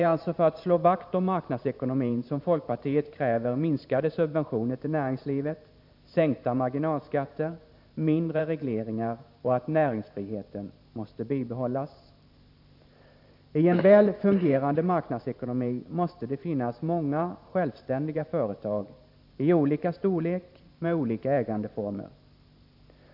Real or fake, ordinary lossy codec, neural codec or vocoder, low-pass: fake; none; vocoder, 44.1 kHz, 128 mel bands every 512 samples, BigVGAN v2; 5.4 kHz